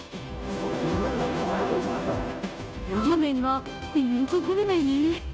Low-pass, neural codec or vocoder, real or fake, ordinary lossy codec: none; codec, 16 kHz, 0.5 kbps, FunCodec, trained on Chinese and English, 25 frames a second; fake; none